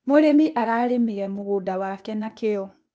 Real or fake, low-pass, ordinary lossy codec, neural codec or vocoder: fake; none; none; codec, 16 kHz, 0.8 kbps, ZipCodec